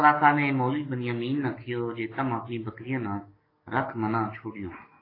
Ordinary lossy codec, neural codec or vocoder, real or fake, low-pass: AAC, 24 kbps; codec, 44.1 kHz, 7.8 kbps, DAC; fake; 5.4 kHz